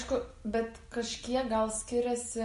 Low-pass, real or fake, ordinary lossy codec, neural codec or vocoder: 14.4 kHz; real; MP3, 48 kbps; none